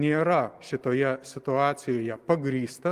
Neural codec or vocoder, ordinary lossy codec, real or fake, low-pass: none; Opus, 24 kbps; real; 14.4 kHz